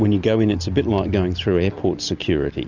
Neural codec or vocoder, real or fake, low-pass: none; real; 7.2 kHz